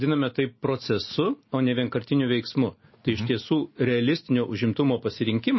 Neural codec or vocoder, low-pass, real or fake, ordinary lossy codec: none; 7.2 kHz; real; MP3, 24 kbps